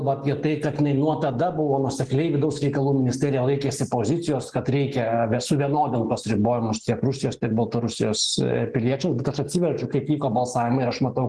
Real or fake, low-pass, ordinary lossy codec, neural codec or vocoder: real; 10.8 kHz; Opus, 16 kbps; none